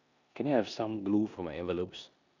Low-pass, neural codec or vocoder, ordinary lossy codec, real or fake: 7.2 kHz; codec, 16 kHz in and 24 kHz out, 0.9 kbps, LongCat-Audio-Codec, four codebook decoder; none; fake